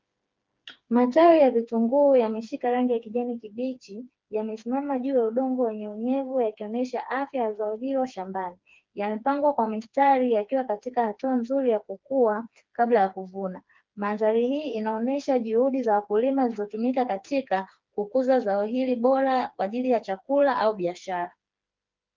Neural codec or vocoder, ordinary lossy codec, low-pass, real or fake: codec, 16 kHz, 4 kbps, FreqCodec, smaller model; Opus, 32 kbps; 7.2 kHz; fake